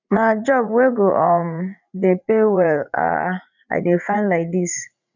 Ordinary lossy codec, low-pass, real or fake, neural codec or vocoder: none; 7.2 kHz; fake; vocoder, 44.1 kHz, 80 mel bands, Vocos